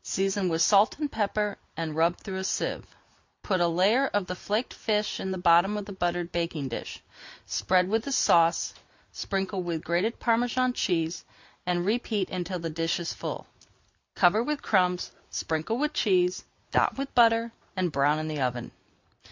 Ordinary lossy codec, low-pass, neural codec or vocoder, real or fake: MP3, 64 kbps; 7.2 kHz; none; real